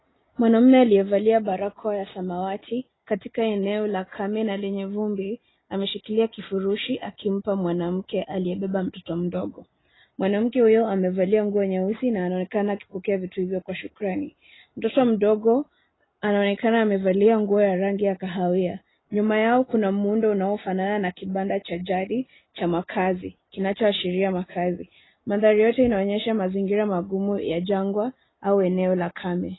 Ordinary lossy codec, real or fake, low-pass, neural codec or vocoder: AAC, 16 kbps; real; 7.2 kHz; none